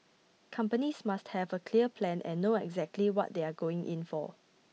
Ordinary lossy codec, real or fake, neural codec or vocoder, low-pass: none; real; none; none